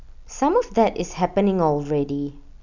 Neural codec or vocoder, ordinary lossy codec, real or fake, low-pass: none; none; real; 7.2 kHz